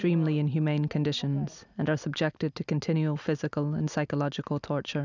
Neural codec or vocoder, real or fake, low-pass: none; real; 7.2 kHz